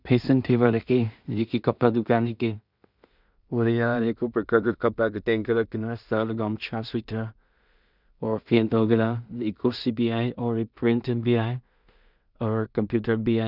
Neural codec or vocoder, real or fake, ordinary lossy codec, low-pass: codec, 16 kHz in and 24 kHz out, 0.4 kbps, LongCat-Audio-Codec, two codebook decoder; fake; none; 5.4 kHz